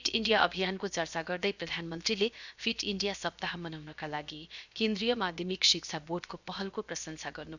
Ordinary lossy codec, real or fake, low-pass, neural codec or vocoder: none; fake; 7.2 kHz; codec, 16 kHz, 0.7 kbps, FocalCodec